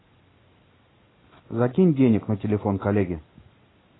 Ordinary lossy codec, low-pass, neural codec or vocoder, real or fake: AAC, 16 kbps; 7.2 kHz; none; real